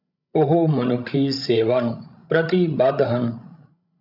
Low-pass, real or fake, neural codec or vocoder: 5.4 kHz; fake; codec, 16 kHz, 16 kbps, FreqCodec, larger model